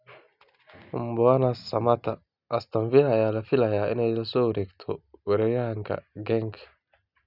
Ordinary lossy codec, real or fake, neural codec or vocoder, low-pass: none; real; none; 5.4 kHz